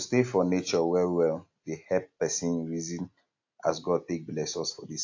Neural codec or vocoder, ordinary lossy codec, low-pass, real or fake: none; AAC, 48 kbps; 7.2 kHz; real